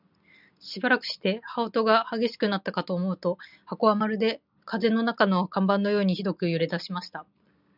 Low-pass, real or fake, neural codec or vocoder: 5.4 kHz; real; none